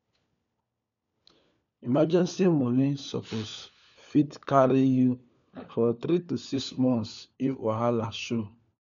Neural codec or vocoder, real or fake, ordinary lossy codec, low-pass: codec, 16 kHz, 4 kbps, FunCodec, trained on LibriTTS, 50 frames a second; fake; MP3, 96 kbps; 7.2 kHz